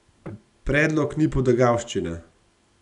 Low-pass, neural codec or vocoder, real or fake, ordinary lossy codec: 10.8 kHz; none; real; none